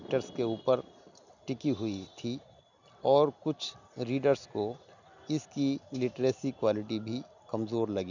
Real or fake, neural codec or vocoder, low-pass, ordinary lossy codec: real; none; 7.2 kHz; none